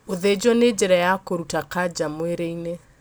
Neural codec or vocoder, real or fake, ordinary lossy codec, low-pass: none; real; none; none